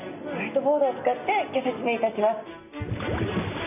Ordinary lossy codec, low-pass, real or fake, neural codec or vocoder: none; 3.6 kHz; real; none